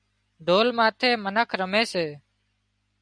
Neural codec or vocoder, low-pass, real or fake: none; 9.9 kHz; real